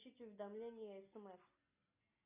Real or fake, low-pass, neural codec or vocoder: real; 3.6 kHz; none